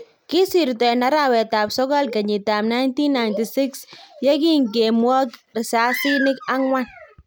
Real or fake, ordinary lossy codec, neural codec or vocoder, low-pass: real; none; none; none